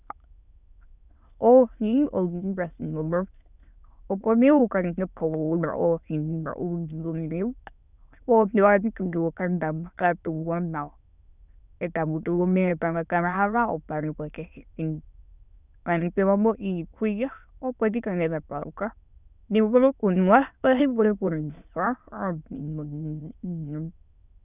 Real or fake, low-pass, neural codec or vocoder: fake; 3.6 kHz; autoencoder, 22.05 kHz, a latent of 192 numbers a frame, VITS, trained on many speakers